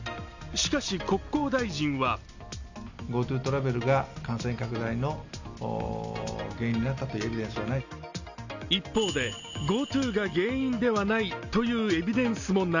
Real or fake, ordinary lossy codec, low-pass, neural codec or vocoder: real; none; 7.2 kHz; none